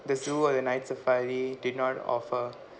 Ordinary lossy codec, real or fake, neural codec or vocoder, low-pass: none; real; none; none